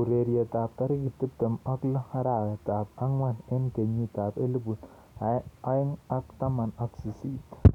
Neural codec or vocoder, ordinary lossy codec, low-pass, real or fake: none; none; 19.8 kHz; real